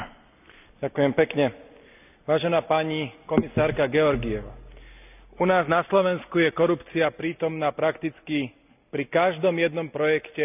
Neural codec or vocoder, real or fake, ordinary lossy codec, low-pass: none; real; none; 3.6 kHz